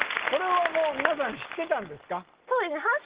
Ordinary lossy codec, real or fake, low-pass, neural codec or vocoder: Opus, 16 kbps; real; 3.6 kHz; none